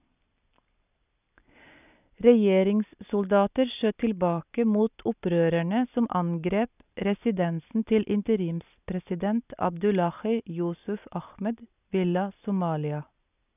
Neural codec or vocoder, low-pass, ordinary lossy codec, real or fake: none; 3.6 kHz; none; real